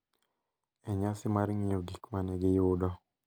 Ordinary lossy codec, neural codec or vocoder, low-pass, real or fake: none; none; none; real